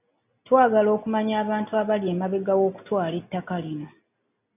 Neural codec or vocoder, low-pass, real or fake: none; 3.6 kHz; real